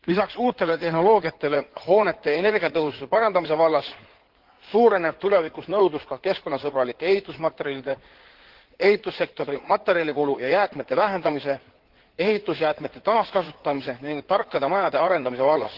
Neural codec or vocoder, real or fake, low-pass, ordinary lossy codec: codec, 16 kHz in and 24 kHz out, 2.2 kbps, FireRedTTS-2 codec; fake; 5.4 kHz; Opus, 16 kbps